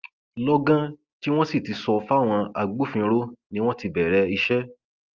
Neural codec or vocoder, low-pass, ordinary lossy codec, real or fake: none; 7.2 kHz; Opus, 24 kbps; real